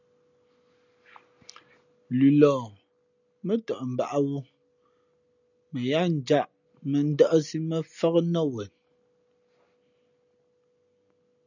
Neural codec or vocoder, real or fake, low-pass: none; real; 7.2 kHz